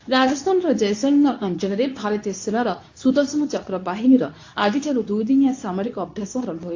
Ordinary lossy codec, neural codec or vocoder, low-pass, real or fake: AAC, 48 kbps; codec, 24 kHz, 0.9 kbps, WavTokenizer, medium speech release version 2; 7.2 kHz; fake